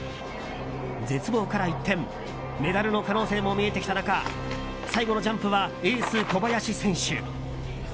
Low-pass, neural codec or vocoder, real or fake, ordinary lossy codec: none; none; real; none